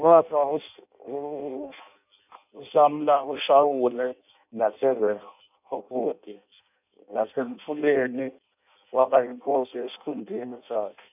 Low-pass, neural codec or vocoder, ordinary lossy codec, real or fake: 3.6 kHz; codec, 16 kHz in and 24 kHz out, 0.6 kbps, FireRedTTS-2 codec; none; fake